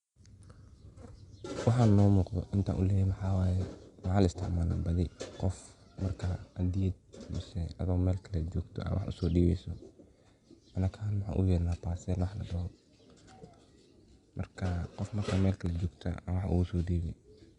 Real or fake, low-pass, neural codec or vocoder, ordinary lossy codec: real; 10.8 kHz; none; Opus, 64 kbps